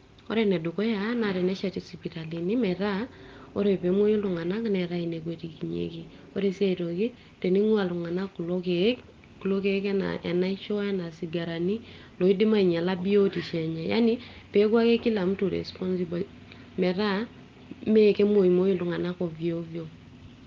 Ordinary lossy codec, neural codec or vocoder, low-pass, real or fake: Opus, 32 kbps; none; 7.2 kHz; real